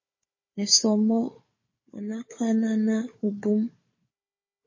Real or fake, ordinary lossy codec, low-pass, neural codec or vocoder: fake; MP3, 32 kbps; 7.2 kHz; codec, 16 kHz, 4 kbps, FunCodec, trained on Chinese and English, 50 frames a second